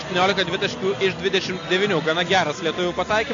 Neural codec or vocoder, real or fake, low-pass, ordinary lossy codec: none; real; 7.2 kHz; AAC, 48 kbps